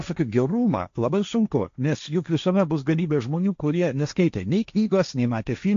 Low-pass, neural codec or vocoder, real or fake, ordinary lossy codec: 7.2 kHz; codec, 16 kHz, 1.1 kbps, Voila-Tokenizer; fake; AAC, 64 kbps